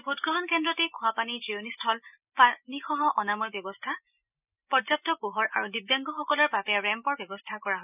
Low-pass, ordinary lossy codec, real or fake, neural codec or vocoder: 3.6 kHz; none; real; none